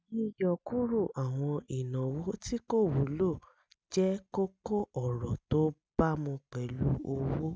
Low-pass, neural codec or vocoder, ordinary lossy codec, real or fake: none; none; none; real